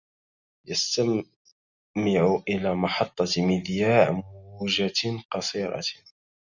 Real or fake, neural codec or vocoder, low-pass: real; none; 7.2 kHz